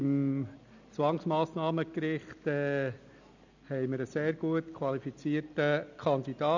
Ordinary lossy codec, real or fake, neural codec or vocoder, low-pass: none; real; none; 7.2 kHz